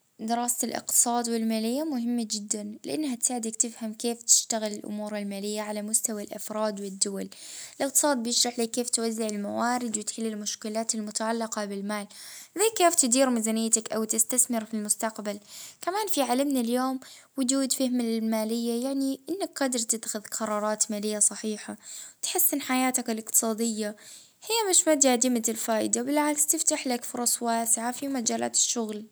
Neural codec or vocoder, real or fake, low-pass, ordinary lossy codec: none; real; none; none